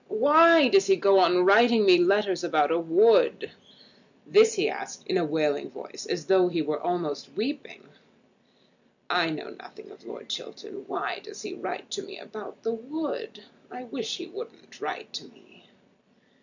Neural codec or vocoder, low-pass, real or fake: none; 7.2 kHz; real